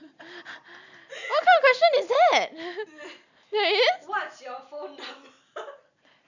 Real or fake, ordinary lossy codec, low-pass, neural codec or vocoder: real; none; 7.2 kHz; none